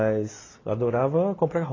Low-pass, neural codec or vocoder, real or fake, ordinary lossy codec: 7.2 kHz; none; real; AAC, 32 kbps